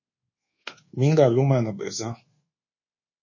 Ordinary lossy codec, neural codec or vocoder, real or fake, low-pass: MP3, 32 kbps; codec, 24 kHz, 1.2 kbps, DualCodec; fake; 7.2 kHz